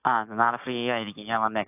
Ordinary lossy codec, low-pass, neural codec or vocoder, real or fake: AAC, 32 kbps; 3.6 kHz; codec, 16 kHz, 6 kbps, DAC; fake